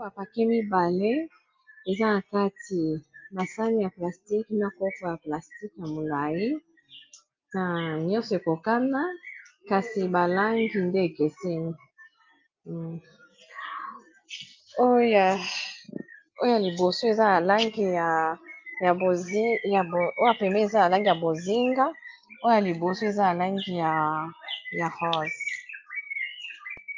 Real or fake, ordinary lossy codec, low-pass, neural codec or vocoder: real; Opus, 24 kbps; 7.2 kHz; none